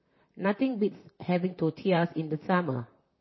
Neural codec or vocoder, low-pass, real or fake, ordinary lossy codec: vocoder, 44.1 kHz, 128 mel bands, Pupu-Vocoder; 7.2 kHz; fake; MP3, 24 kbps